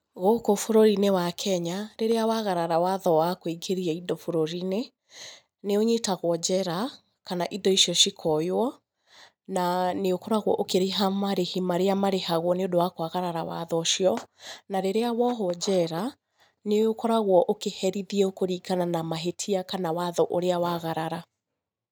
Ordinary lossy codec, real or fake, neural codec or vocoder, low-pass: none; real; none; none